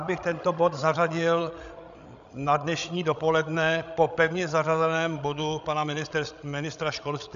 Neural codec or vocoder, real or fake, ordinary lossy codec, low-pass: codec, 16 kHz, 16 kbps, FreqCodec, larger model; fake; AAC, 96 kbps; 7.2 kHz